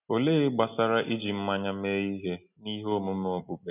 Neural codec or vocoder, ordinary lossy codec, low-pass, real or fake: none; none; 3.6 kHz; real